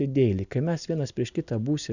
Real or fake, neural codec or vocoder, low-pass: real; none; 7.2 kHz